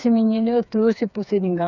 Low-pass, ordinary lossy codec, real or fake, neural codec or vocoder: 7.2 kHz; none; fake; codec, 16 kHz, 4 kbps, FreqCodec, smaller model